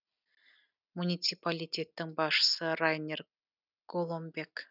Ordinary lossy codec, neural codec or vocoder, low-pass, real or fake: none; none; 5.4 kHz; real